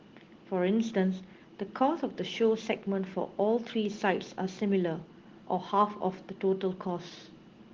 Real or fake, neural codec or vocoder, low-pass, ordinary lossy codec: real; none; 7.2 kHz; Opus, 16 kbps